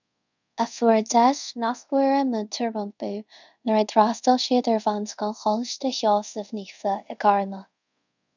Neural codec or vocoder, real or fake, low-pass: codec, 24 kHz, 0.5 kbps, DualCodec; fake; 7.2 kHz